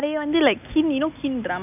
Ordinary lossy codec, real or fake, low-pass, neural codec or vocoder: none; real; 3.6 kHz; none